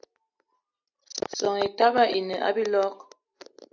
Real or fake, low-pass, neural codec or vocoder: real; 7.2 kHz; none